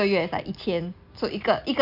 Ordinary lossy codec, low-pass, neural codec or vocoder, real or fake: none; 5.4 kHz; none; real